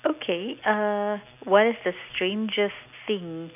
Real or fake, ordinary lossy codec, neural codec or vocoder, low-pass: real; none; none; 3.6 kHz